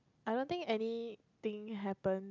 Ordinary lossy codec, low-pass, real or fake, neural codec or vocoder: none; 7.2 kHz; real; none